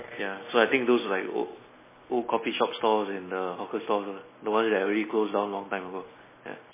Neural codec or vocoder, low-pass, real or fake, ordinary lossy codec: none; 3.6 kHz; real; MP3, 16 kbps